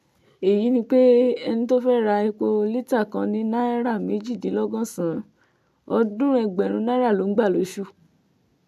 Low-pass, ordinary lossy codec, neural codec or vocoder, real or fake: 14.4 kHz; MP3, 64 kbps; none; real